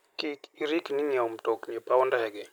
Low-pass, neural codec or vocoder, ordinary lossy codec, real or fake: none; none; none; real